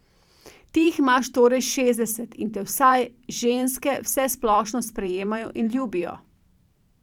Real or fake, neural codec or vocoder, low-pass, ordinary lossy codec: fake; vocoder, 44.1 kHz, 128 mel bands every 512 samples, BigVGAN v2; 19.8 kHz; none